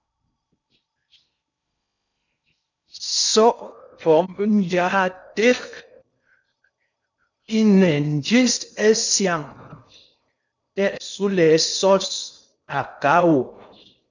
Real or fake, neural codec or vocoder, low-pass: fake; codec, 16 kHz in and 24 kHz out, 0.6 kbps, FocalCodec, streaming, 4096 codes; 7.2 kHz